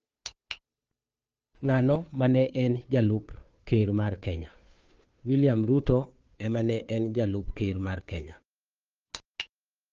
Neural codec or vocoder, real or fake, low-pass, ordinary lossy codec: codec, 16 kHz, 2 kbps, FunCodec, trained on Chinese and English, 25 frames a second; fake; 7.2 kHz; Opus, 24 kbps